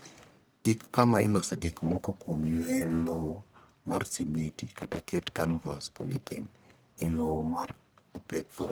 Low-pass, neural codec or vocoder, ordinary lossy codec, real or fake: none; codec, 44.1 kHz, 1.7 kbps, Pupu-Codec; none; fake